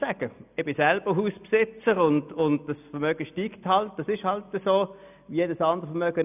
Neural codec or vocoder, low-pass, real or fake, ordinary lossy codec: none; 3.6 kHz; real; none